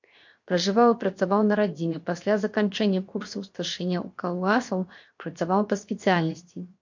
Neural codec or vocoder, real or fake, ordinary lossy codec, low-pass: codec, 16 kHz, 0.7 kbps, FocalCodec; fake; MP3, 48 kbps; 7.2 kHz